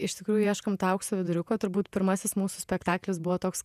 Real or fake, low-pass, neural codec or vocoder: fake; 14.4 kHz; vocoder, 48 kHz, 128 mel bands, Vocos